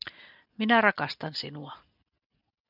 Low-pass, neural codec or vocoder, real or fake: 5.4 kHz; none; real